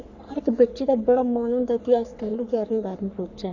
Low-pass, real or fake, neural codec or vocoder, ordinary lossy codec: 7.2 kHz; fake; codec, 44.1 kHz, 3.4 kbps, Pupu-Codec; none